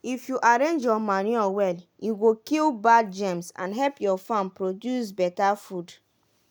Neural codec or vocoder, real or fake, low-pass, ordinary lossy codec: none; real; none; none